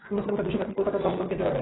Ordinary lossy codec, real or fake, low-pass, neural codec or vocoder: AAC, 16 kbps; real; 7.2 kHz; none